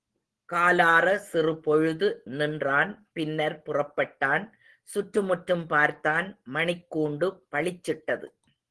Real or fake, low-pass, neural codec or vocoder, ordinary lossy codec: real; 10.8 kHz; none; Opus, 16 kbps